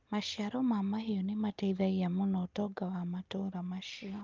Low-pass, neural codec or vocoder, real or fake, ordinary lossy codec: 7.2 kHz; none; real; Opus, 24 kbps